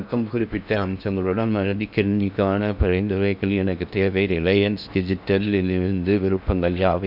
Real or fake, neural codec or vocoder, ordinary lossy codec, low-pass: fake; codec, 16 kHz in and 24 kHz out, 0.8 kbps, FocalCodec, streaming, 65536 codes; none; 5.4 kHz